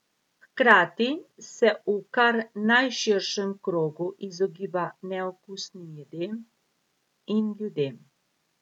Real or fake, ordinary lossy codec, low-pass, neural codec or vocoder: real; none; 19.8 kHz; none